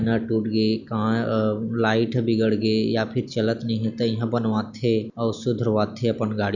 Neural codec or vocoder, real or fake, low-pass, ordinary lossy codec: none; real; 7.2 kHz; none